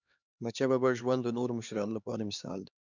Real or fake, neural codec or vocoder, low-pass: fake; codec, 16 kHz, 2 kbps, X-Codec, HuBERT features, trained on LibriSpeech; 7.2 kHz